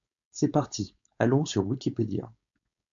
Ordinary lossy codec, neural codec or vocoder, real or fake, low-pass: MP3, 64 kbps; codec, 16 kHz, 4.8 kbps, FACodec; fake; 7.2 kHz